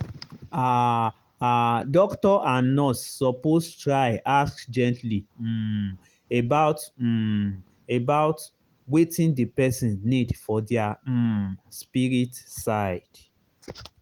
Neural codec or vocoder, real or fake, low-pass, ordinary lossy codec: none; real; 19.8 kHz; Opus, 24 kbps